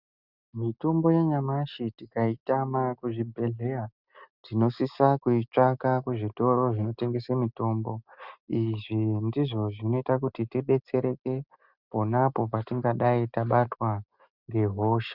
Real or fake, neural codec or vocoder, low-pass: real; none; 5.4 kHz